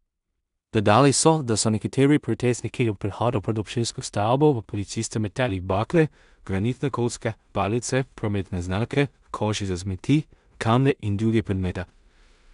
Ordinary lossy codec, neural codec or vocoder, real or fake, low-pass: none; codec, 16 kHz in and 24 kHz out, 0.4 kbps, LongCat-Audio-Codec, two codebook decoder; fake; 10.8 kHz